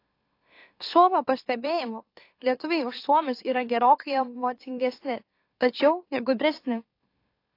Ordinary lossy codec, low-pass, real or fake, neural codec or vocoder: AAC, 32 kbps; 5.4 kHz; fake; autoencoder, 44.1 kHz, a latent of 192 numbers a frame, MeloTTS